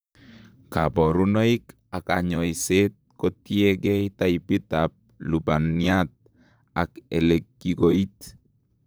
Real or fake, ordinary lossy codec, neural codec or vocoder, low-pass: fake; none; vocoder, 44.1 kHz, 128 mel bands, Pupu-Vocoder; none